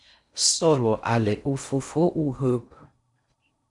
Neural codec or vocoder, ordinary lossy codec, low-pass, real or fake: codec, 16 kHz in and 24 kHz out, 0.6 kbps, FocalCodec, streaming, 4096 codes; Opus, 64 kbps; 10.8 kHz; fake